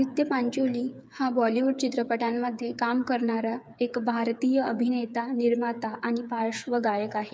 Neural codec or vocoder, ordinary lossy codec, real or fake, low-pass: codec, 16 kHz, 8 kbps, FreqCodec, smaller model; none; fake; none